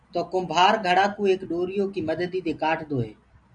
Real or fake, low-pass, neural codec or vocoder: real; 9.9 kHz; none